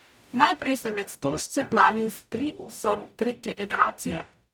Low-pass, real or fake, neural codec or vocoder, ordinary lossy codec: 19.8 kHz; fake; codec, 44.1 kHz, 0.9 kbps, DAC; none